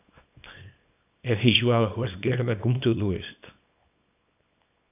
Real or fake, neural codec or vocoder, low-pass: fake; codec, 24 kHz, 0.9 kbps, WavTokenizer, small release; 3.6 kHz